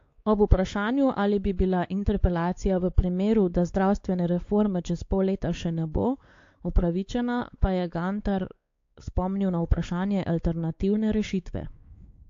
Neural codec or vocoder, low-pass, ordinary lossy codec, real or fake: codec, 16 kHz, 4 kbps, X-Codec, WavLM features, trained on Multilingual LibriSpeech; 7.2 kHz; AAC, 48 kbps; fake